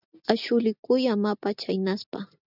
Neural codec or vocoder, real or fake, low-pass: none; real; 5.4 kHz